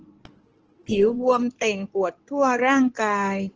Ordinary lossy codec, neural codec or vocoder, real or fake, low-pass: Opus, 16 kbps; codec, 16 kHz in and 24 kHz out, 2.2 kbps, FireRedTTS-2 codec; fake; 7.2 kHz